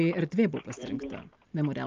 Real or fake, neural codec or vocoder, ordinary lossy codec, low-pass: real; none; Opus, 24 kbps; 7.2 kHz